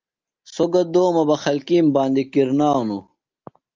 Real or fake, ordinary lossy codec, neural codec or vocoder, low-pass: real; Opus, 24 kbps; none; 7.2 kHz